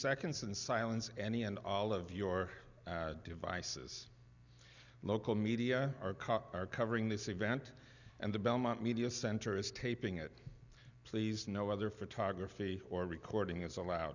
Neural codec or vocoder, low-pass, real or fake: none; 7.2 kHz; real